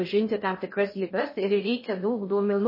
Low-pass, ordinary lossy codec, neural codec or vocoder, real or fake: 5.4 kHz; MP3, 24 kbps; codec, 16 kHz in and 24 kHz out, 0.6 kbps, FocalCodec, streaming, 2048 codes; fake